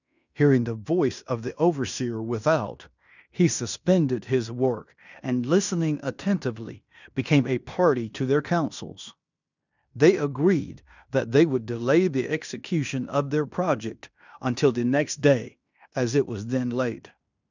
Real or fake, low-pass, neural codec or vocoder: fake; 7.2 kHz; codec, 16 kHz in and 24 kHz out, 0.9 kbps, LongCat-Audio-Codec, fine tuned four codebook decoder